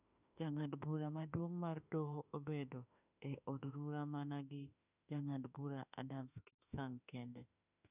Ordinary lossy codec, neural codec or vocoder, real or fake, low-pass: none; autoencoder, 48 kHz, 32 numbers a frame, DAC-VAE, trained on Japanese speech; fake; 3.6 kHz